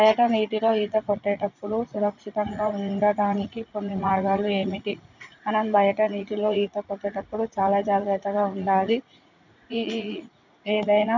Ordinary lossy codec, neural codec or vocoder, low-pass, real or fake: none; vocoder, 44.1 kHz, 80 mel bands, Vocos; 7.2 kHz; fake